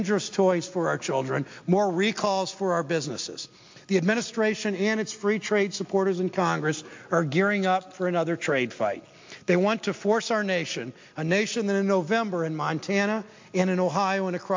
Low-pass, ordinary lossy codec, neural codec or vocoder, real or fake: 7.2 kHz; MP3, 48 kbps; none; real